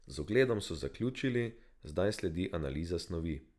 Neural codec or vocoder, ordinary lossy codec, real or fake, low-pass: none; none; real; none